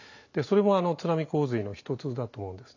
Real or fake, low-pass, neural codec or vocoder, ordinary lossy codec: real; 7.2 kHz; none; none